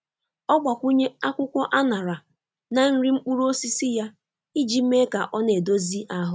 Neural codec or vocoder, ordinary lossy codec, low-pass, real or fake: none; none; none; real